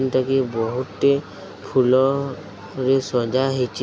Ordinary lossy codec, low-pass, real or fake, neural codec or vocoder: none; none; real; none